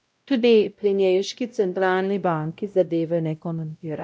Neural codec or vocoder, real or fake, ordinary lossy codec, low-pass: codec, 16 kHz, 0.5 kbps, X-Codec, WavLM features, trained on Multilingual LibriSpeech; fake; none; none